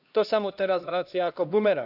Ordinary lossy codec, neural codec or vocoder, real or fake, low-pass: none; codec, 16 kHz, 1 kbps, X-Codec, HuBERT features, trained on LibriSpeech; fake; 5.4 kHz